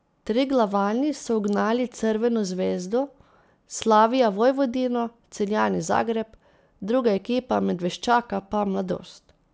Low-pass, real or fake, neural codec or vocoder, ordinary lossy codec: none; real; none; none